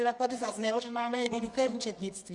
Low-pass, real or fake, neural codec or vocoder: 10.8 kHz; fake; codec, 24 kHz, 0.9 kbps, WavTokenizer, medium music audio release